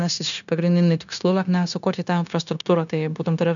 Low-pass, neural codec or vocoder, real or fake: 7.2 kHz; codec, 16 kHz, 0.9 kbps, LongCat-Audio-Codec; fake